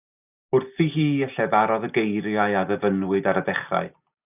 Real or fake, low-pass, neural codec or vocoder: real; 3.6 kHz; none